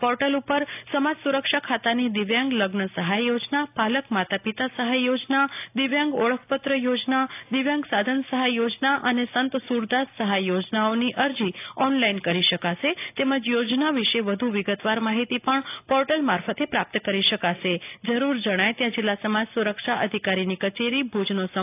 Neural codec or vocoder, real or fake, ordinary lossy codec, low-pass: none; real; AAC, 32 kbps; 3.6 kHz